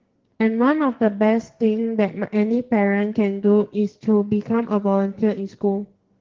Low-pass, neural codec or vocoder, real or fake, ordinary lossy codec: 7.2 kHz; codec, 44.1 kHz, 2.6 kbps, SNAC; fake; Opus, 16 kbps